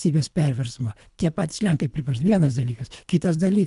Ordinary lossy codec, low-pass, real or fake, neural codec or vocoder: MP3, 96 kbps; 10.8 kHz; fake; codec, 24 kHz, 3 kbps, HILCodec